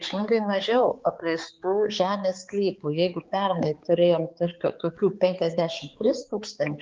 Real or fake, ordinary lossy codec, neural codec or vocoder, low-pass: fake; Opus, 32 kbps; codec, 16 kHz, 4 kbps, X-Codec, HuBERT features, trained on balanced general audio; 7.2 kHz